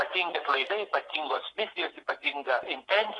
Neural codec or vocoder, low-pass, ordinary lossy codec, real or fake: vocoder, 24 kHz, 100 mel bands, Vocos; 10.8 kHz; AAC, 32 kbps; fake